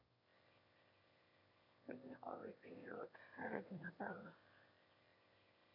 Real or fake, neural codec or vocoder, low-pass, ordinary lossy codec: fake; autoencoder, 22.05 kHz, a latent of 192 numbers a frame, VITS, trained on one speaker; 5.4 kHz; none